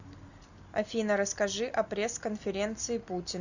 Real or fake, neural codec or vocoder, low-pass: real; none; 7.2 kHz